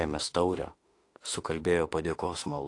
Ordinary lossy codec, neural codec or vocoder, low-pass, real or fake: AAC, 48 kbps; autoencoder, 48 kHz, 32 numbers a frame, DAC-VAE, trained on Japanese speech; 10.8 kHz; fake